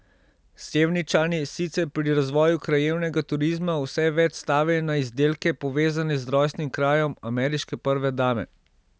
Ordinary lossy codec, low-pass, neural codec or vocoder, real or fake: none; none; none; real